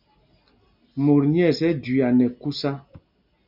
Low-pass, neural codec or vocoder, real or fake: 5.4 kHz; none; real